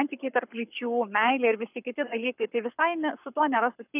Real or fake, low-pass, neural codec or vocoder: fake; 3.6 kHz; codec, 44.1 kHz, 7.8 kbps, Pupu-Codec